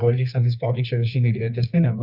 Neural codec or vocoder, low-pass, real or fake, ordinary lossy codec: codec, 24 kHz, 0.9 kbps, WavTokenizer, medium music audio release; 5.4 kHz; fake; Opus, 64 kbps